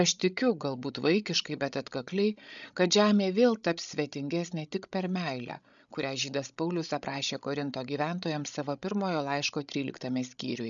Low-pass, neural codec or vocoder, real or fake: 7.2 kHz; codec, 16 kHz, 16 kbps, FreqCodec, larger model; fake